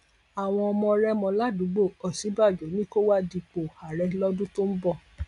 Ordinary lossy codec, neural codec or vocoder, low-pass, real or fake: none; none; 10.8 kHz; real